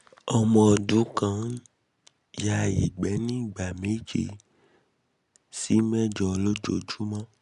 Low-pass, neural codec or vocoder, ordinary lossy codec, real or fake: 10.8 kHz; none; none; real